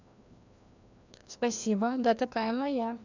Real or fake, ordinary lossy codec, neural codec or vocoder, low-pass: fake; none; codec, 16 kHz, 1 kbps, FreqCodec, larger model; 7.2 kHz